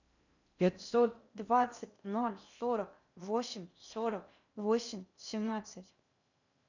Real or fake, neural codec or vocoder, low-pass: fake; codec, 16 kHz in and 24 kHz out, 0.6 kbps, FocalCodec, streaming, 4096 codes; 7.2 kHz